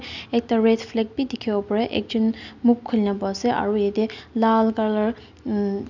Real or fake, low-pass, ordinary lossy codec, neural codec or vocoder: real; 7.2 kHz; none; none